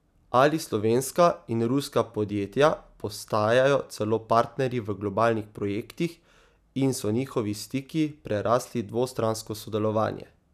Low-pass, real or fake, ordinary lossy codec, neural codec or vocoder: 14.4 kHz; real; none; none